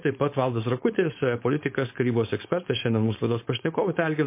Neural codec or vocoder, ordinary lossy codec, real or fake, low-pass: codec, 16 kHz, 4.8 kbps, FACodec; MP3, 24 kbps; fake; 3.6 kHz